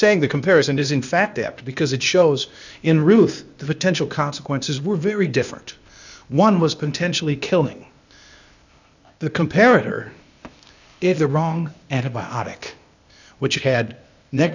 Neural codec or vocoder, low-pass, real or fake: codec, 16 kHz, 0.8 kbps, ZipCodec; 7.2 kHz; fake